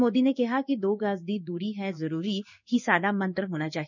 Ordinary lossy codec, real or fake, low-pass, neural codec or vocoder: none; fake; 7.2 kHz; codec, 16 kHz in and 24 kHz out, 1 kbps, XY-Tokenizer